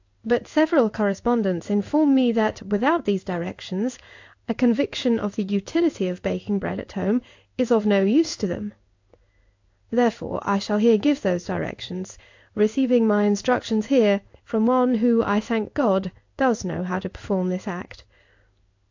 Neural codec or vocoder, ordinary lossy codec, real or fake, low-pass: codec, 16 kHz in and 24 kHz out, 1 kbps, XY-Tokenizer; AAC, 48 kbps; fake; 7.2 kHz